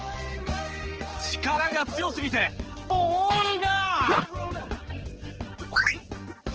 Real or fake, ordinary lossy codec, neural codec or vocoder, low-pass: fake; Opus, 16 kbps; codec, 16 kHz, 4 kbps, X-Codec, HuBERT features, trained on general audio; 7.2 kHz